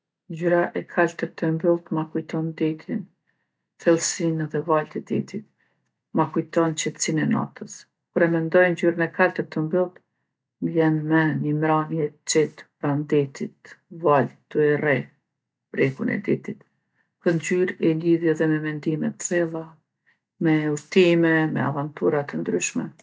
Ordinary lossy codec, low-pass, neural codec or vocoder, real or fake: none; none; none; real